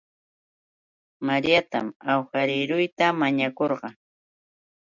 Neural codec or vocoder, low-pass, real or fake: none; 7.2 kHz; real